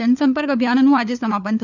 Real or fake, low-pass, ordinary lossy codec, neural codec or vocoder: fake; 7.2 kHz; none; codec, 16 kHz, 4 kbps, FunCodec, trained on LibriTTS, 50 frames a second